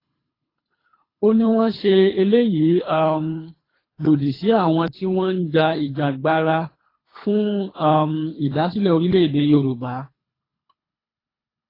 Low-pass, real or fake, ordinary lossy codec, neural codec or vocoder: 5.4 kHz; fake; AAC, 24 kbps; codec, 24 kHz, 3 kbps, HILCodec